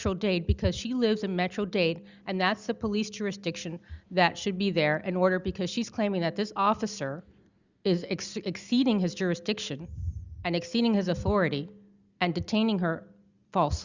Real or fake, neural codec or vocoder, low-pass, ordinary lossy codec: real; none; 7.2 kHz; Opus, 64 kbps